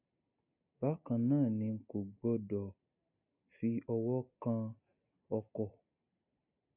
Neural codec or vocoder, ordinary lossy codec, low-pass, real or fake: none; none; 3.6 kHz; real